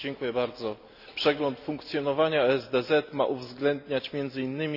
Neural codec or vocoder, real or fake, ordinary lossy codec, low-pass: none; real; none; 5.4 kHz